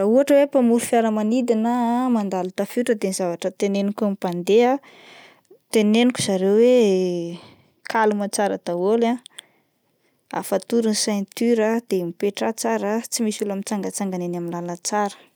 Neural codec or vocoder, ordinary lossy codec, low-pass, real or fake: none; none; none; real